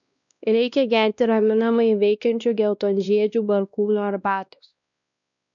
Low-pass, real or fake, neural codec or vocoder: 7.2 kHz; fake; codec, 16 kHz, 1 kbps, X-Codec, WavLM features, trained on Multilingual LibriSpeech